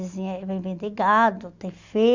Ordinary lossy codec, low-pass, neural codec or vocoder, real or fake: Opus, 64 kbps; 7.2 kHz; none; real